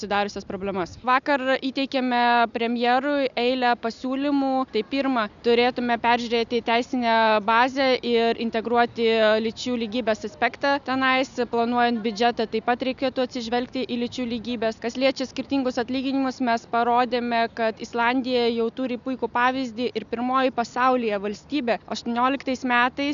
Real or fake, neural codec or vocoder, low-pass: real; none; 7.2 kHz